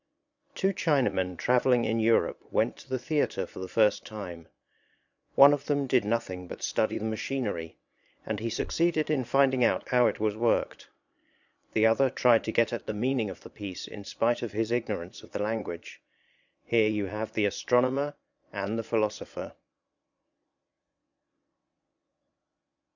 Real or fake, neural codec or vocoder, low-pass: fake; vocoder, 22.05 kHz, 80 mel bands, Vocos; 7.2 kHz